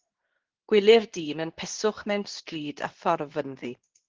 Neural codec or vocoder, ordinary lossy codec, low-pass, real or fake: codec, 24 kHz, 0.9 kbps, WavTokenizer, medium speech release version 2; Opus, 16 kbps; 7.2 kHz; fake